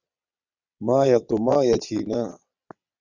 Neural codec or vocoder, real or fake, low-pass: vocoder, 22.05 kHz, 80 mel bands, WaveNeXt; fake; 7.2 kHz